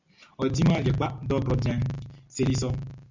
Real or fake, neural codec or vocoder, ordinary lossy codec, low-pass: real; none; MP3, 64 kbps; 7.2 kHz